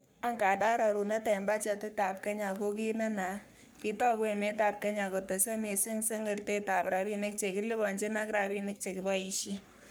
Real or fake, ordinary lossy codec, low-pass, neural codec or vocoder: fake; none; none; codec, 44.1 kHz, 3.4 kbps, Pupu-Codec